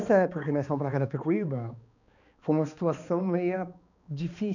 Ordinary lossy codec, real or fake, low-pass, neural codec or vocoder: none; fake; 7.2 kHz; codec, 16 kHz, 2 kbps, X-Codec, HuBERT features, trained on balanced general audio